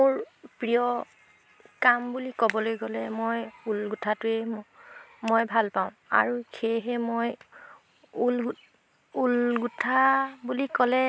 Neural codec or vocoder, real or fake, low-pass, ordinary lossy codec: none; real; none; none